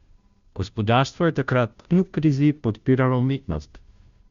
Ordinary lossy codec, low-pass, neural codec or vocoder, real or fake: Opus, 64 kbps; 7.2 kHz; codec, 16 kHz, 0.5 kbps, FunCodec, trained on Chinese and English, 25 frames a second; fake